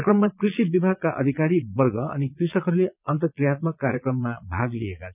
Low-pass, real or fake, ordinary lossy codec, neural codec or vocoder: 3.6 kHz; fake; none; vocoder, 22.05 kHz, 80 mel bands, Vocos